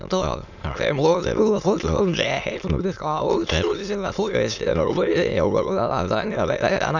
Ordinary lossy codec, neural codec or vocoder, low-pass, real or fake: none; autoencoder, 22.05 kHz, a latent of 192 numbers a frame, VITS, trained on many speakers; 7.2 kHz; fake